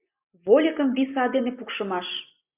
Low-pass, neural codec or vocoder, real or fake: 3.6 kHz; none; real